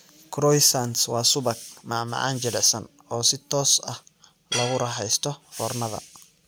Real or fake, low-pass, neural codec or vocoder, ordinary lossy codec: real; none; none; none